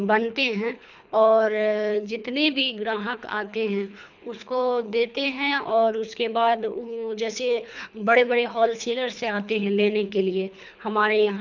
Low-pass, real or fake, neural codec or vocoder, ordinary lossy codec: 7.2 kHz; fake; codec, 24 kHz, 3 kbps, HILCodec; none